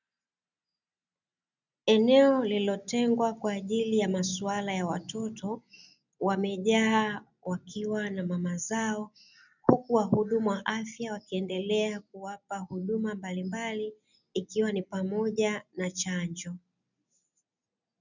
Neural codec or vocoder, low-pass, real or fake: none; 7.2 kHz; real